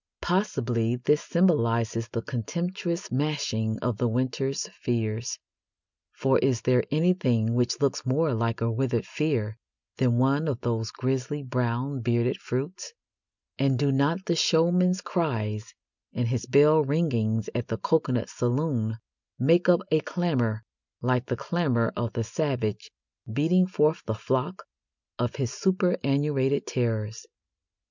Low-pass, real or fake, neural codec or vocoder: 7.2 kHz; real; none